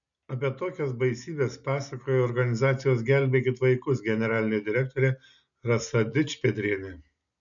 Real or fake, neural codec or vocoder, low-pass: real; none; 7.2 kHz